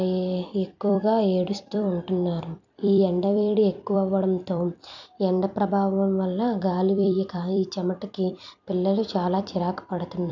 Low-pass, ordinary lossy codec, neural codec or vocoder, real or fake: 7.2 kHz; none; vocoder, 44.1 kHz, 128 mel bands every 256 samples, BigVGAN v2; fake